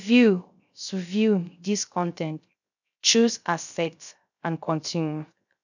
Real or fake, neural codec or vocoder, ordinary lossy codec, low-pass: fake; codec, 16 kHz, 0.3 kbps, FocalCodec; none; 7.2 kHz